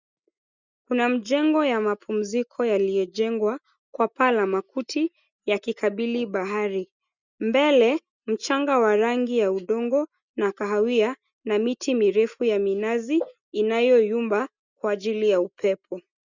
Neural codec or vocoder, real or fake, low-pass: none; real; 7.2 kHz